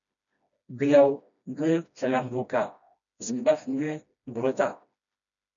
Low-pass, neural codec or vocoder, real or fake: 7.2 kHz; codec, 16 kHz, 1 kbps, FreqCodec, smaller model; fake